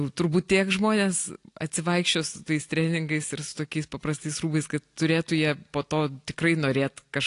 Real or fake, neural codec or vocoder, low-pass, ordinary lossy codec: real; none; 10.8 kHz; AAC, 48 kbps